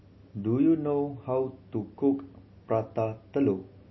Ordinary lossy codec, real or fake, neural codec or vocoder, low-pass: MP3, 24 kbps; real; none; 7.2 kHz